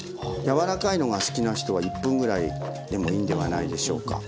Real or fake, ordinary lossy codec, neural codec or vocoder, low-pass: real; none; none; none